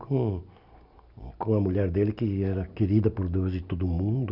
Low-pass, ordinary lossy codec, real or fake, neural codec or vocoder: 5.4 kHz; none; real; none